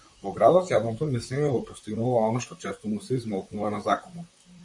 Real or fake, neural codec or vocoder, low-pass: fake; vocoder, 44.1 kHz, 128 mel bands, Pupu-Vocoder; 10.8 kHz